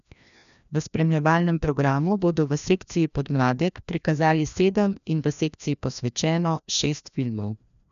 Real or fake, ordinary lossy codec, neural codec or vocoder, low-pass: fake; none; codec, 16 kHz, 1 kbps, FreqCodec, larger model; 7.2 kHz